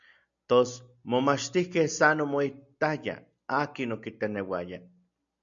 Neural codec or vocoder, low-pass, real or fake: none; 7.2 kHz; real